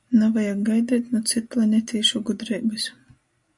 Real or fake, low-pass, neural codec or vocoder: real; 10.8 kHz; none